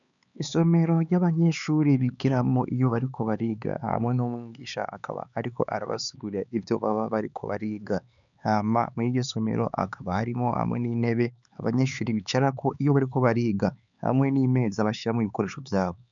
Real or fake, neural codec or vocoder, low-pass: fake; codec, 16 kHz, 4 kbps, X-Codec, HuBERT features, trained on LibriSpeech; 7.2 kHz